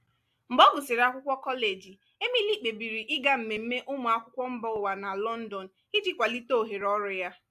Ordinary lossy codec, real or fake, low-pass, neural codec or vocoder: AAC, 96 kbps; real; 14.4 kHz; none